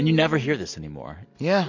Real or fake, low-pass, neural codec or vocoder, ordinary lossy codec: real; 7.2 kHz; none; MP3, 48 kbps